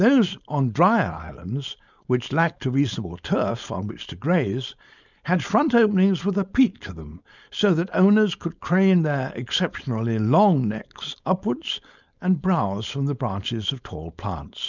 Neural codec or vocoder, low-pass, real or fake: codec, 16 kHz, 4.8 kbps, FACodec; 7.2 kHz; fake